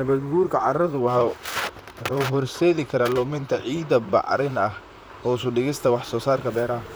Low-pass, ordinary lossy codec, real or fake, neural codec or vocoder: none; none; fake; vocoder, 44.1 kHz, 128 mel bands, Pupu-Vocoder